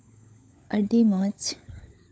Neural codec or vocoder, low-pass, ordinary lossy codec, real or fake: codec, 16 kHz, 16 kbps, FunCodec, trained on LibriTTS, 50 frames a second; none; none; fake